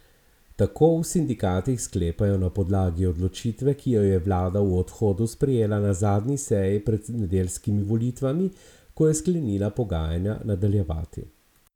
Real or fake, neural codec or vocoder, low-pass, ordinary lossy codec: real; none; 19.8 kHz; none